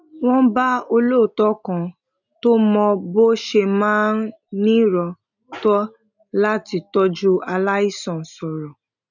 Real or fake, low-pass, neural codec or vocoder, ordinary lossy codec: real; 7.2 kHz; none; none